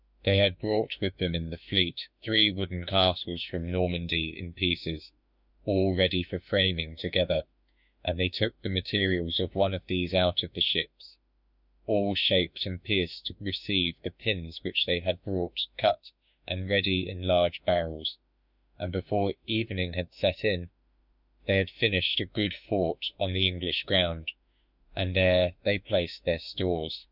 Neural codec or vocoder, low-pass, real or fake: autoencoder, 48 kHz, 32 numbers a frame, DAC-VAE, trained on Japanese speech; 5.4 kHz; fake